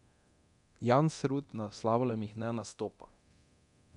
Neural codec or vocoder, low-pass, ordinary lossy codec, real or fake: codec, 24 kHz, 0.9 kbps, DualCodec; 10.8 kHz; none; fake